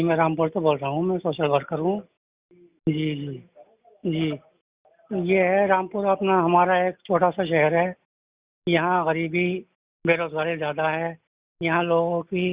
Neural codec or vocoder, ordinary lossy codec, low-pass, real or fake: none; Opus, 64 kbps; 3.6 kHz; real